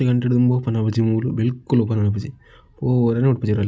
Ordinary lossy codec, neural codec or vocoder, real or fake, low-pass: none; none; real; none